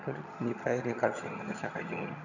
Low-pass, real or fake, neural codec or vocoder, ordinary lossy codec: 7.2 kHz; fake; vocoder, 22.05 kHz, 80 mel bands, HiFi-GAN; none